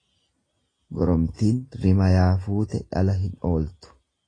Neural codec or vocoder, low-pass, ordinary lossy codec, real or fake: none; 9.9 kHz; AAC, 32 kbps; real